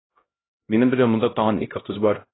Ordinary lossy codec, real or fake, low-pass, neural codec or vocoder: AAC, 16 kbps; fake; 7.2 kHz; codec, 16 kHz, 0.5 kbps, X-Codec, HuBERT features, trained on LibriSpeech